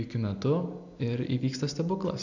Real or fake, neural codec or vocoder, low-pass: real; none; 7.2 kHz